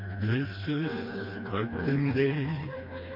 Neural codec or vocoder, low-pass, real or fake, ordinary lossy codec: codec, 16 kHz, 2 kbps, FreqCodec, smaller model; 5.4 kHz; fake; MP3, 32 kbps